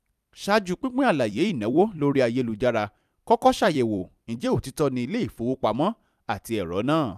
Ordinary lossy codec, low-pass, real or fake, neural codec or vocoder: none; 14.4 kHz; fake; vocoder, 44.1 kHz, 128 mel bands every 256 samples, BigVGAN v2